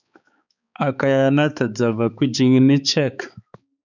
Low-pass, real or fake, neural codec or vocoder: 7.2 kHz; fake; codec, 16 kHz, 4 kbps, X-Codec, HuBERT features, trained on balanced general audio